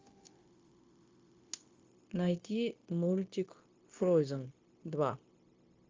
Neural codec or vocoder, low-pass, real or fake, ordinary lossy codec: codec, 16 kHz, 0.9 kbps, LongCat-Audio-Codec; 7.2 kHz; fake; Opus, 32 kbps